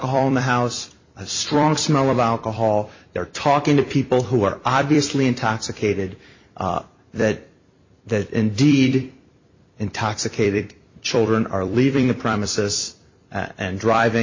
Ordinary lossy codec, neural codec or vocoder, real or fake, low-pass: MP3, 32 kbps; vocoder, 44.1 kHz, 128 mel bands every 256 samples, BigVGAN v2; fake; 7.2 kHz